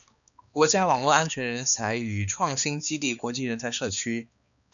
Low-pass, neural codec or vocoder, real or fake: 7.2 kHz; codec, 16 kHz, 2 kbps, X-Codec, HuBERT features, trained on balanced general audio; fake